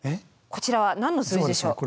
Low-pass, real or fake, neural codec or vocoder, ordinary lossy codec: none; real; none; none